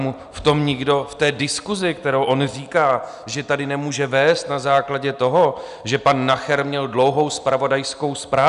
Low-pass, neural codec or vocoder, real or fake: 10.8 kHz; none; real